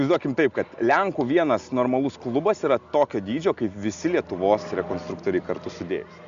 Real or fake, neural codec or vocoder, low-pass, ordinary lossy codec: real; none; 7.2 kHz; Opus, 64 kbps